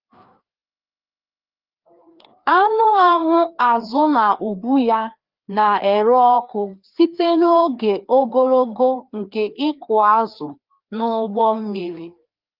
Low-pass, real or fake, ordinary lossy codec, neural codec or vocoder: 5.4 kHz; fake; Opus, 24 kbps; codec, 16 kHz, 2 kbps, FreqCodec, larger model